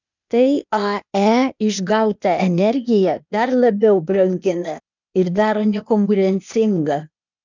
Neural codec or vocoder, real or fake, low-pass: codec, 16 kHz, 0.8 kbps, ZipCodec; fake; 7.2 kHz